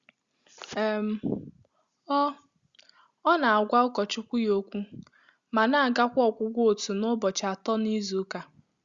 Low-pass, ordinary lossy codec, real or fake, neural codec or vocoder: 7.2 kHz; Opus, 64 kbps; real; none